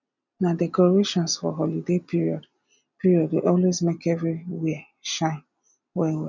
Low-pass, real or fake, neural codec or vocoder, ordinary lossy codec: 7.2 kHz; real; none; none